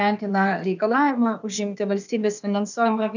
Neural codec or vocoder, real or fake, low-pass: codec, 16 kHz, 0.8 kbps, ZipCodec; fake; 7.2 kHz